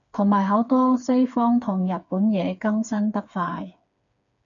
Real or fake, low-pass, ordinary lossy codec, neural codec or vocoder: fake; 7.2 kHz; MP3, 96 kbps; codec, 16 kHz, 4 kbps, FreqCodec, smaller model